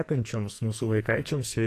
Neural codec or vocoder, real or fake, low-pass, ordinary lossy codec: codec, 44.1 kHz, 2.6 kbps, SNAC; fake; 14.4 kHz; AAC, 64 kbps